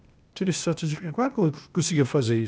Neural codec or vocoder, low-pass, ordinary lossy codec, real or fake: codec, 16 kHz, 0.8 kbps, ZipCodec; none; none; fake